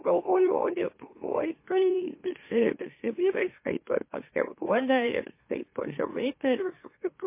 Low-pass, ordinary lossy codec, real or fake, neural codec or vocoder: 3.6 kHz; MP3, 24 kbps; fake; autoencoder, 44.1 kHz, a latent of 192 numbers a frame, MeloTTS